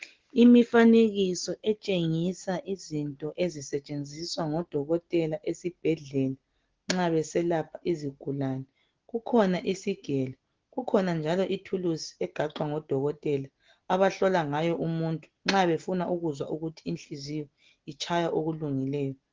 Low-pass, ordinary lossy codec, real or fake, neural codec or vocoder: 7.2 kHz; Opus, 16 kbps; real; none